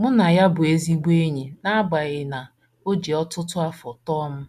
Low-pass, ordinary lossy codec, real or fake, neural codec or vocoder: 14.4 kHz; MP3, 96 kbps; real; none